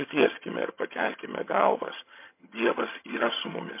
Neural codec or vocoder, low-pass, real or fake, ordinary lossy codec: vocoder, 22.05 kHz, 80 mel bands, HiFi-GAN; 3.6 kHz; fake; MP3, 24 kbps